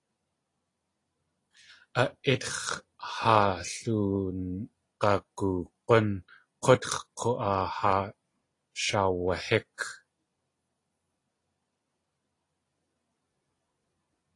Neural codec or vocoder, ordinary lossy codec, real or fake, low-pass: none; AAC, 32 kbps; real; 10.8 kHz